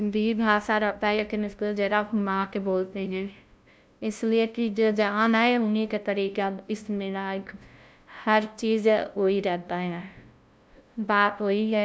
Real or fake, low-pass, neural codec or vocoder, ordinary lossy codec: fake; none; codec, 16 kHz, 0.5 kbps, FunCodec, trained on LibriTTS, 25 frames a second; none